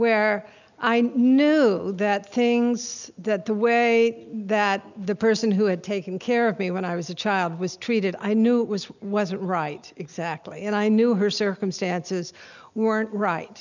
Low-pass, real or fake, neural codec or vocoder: 7.2 kHz; real; none